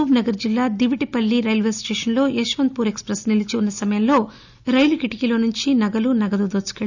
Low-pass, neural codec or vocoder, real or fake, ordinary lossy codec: 7.2 kHz; none; real; none